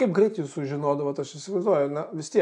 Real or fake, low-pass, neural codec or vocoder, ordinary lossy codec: real; 10.8 kHz; none; MP3, 64 kbps